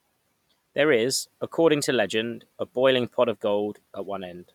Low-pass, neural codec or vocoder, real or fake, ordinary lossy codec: 19.8 kHz; vocoder, 44.1 kHz, 128 mel bands every 256 samples, BigVGAN v2; fake; none